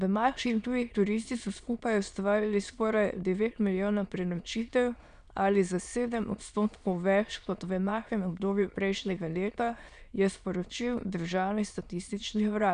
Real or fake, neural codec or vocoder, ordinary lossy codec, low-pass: fake; autoencoder, 22.05 kHz, a latent of 192 numbers a frame, VITS, trained on many speakers; none; 9.9 kHz